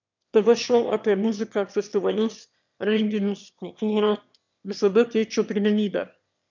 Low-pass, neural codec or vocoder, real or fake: 7.2 kHz; autoencoder, 22.05 kHz, a latent of 192 numbers a frame, VITS, trained on one speaker; fake